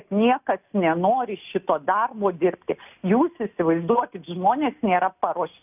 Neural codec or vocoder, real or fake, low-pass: none; real; 3.6 kHz